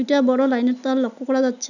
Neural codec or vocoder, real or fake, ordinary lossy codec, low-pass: none; real; none; 7.2 kHz